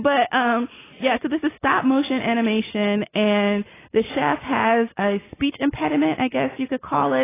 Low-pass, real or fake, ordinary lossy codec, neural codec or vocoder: 3.6 kHz; real; AAC, 16 kbps; none